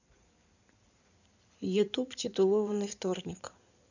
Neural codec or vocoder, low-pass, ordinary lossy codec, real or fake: codec, 16 kHz in and 24 kHz out, 2.2 kbps, FireRedTTS-2 codec; 7.2 kHz; none; fake